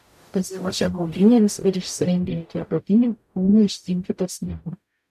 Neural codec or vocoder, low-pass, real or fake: codec, 44.1 kHz, 0.9 kbps, DAC; 14.4 kHz; fake